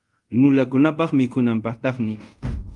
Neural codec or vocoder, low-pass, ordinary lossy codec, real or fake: codec, 24 kHz, 0.5 kbps, DualCodec; 10.8 kHz; Opus, 32 kbps; fake